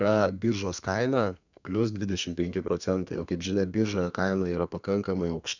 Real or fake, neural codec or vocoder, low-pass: fake; codec, 32 kHz, 1.9 kbps, SNAC; 7.2 kHz